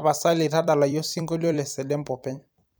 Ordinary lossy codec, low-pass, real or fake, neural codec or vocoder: none; none; fake; vocoder, 44.1 kHz, 128 mel bands every 256 samples, BigVGAN v2